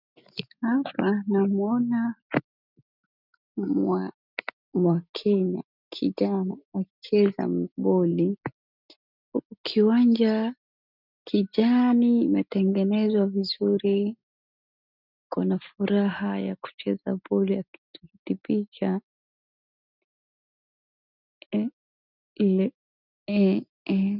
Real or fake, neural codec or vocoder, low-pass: real; none; 5.4 kHz